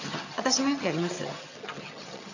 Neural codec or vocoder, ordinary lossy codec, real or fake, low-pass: vocoder, 22.05 kHz, 80 mel bands, HiFi-GAN; none; fake; 7.2 kHz